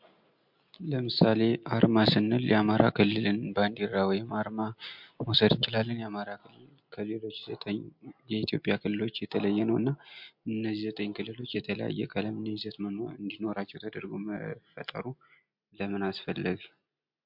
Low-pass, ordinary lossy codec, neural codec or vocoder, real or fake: 5.4 kHz; MP3, 48 kbps; none; real